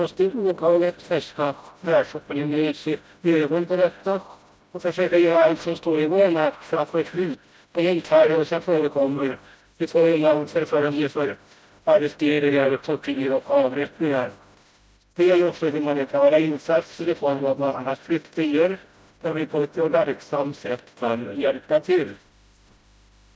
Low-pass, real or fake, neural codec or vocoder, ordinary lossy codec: none; fake; codec, 16 kHz, 0.5 kbps, FreqCodec, smaller model; none